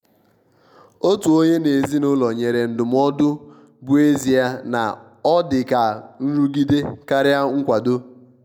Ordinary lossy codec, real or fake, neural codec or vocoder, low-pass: none; real; none; 19.8 kHz